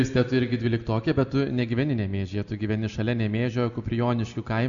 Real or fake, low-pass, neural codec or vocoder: real; 7.2 kHz; none